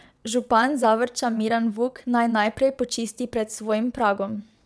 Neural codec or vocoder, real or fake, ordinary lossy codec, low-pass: vocoder, 22.05 kHz, 80 mel bands, WaveNeXt; fake; none; none